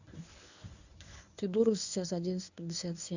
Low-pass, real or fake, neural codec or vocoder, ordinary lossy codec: 7.2 kHz; fake; codec, 24 kHz, 0.9 kbps, WavTokenizer, medium speech release version 1; none